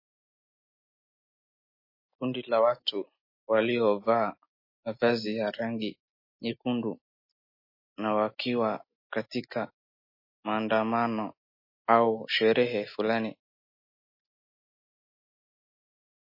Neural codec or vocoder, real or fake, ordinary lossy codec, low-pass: none; real; MP3, 24 kbps; 5.4 kHz